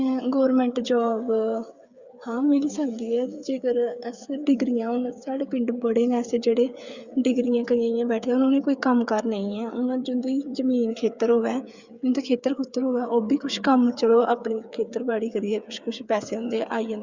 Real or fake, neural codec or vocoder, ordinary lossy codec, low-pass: fake; codec, 16 kHz, 8 kbps, FreqCodec, smaller model; Opus, 64 kbps; 7.2 kHz